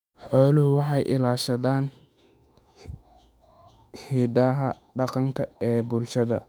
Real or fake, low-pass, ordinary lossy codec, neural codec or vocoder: fake; 19.8 kHz; none; autoencoder, 48 kHz, 32 numbers a frame, DAC-VAE, trained on Japanese speech